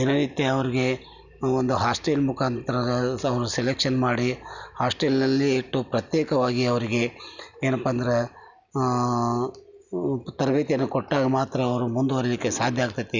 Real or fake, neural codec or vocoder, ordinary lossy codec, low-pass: real; none; none; 7.2 kHz